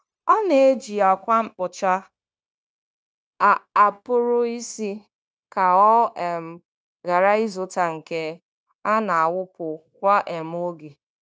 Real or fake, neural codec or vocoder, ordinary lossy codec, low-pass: fake; codec, 16 kHz, 0.9 kbps, LongCat-Audio-Codec; none; none